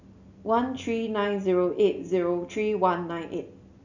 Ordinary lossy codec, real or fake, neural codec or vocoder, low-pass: none; real; none; 7.2 kHz